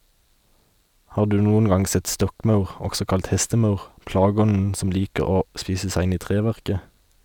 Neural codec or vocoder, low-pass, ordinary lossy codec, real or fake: none; 19.8 kHz; none; real